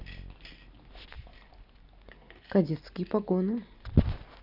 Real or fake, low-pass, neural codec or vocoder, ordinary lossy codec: real; 5.4 kHz; none; none